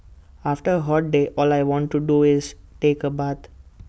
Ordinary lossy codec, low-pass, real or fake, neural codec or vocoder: none; none; real; none